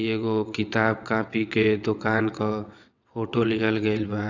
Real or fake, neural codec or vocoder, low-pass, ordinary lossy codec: fake; vocoder, 22.05 kHz, 80 mel bands, WaveNeXt; 7.2 kHz; none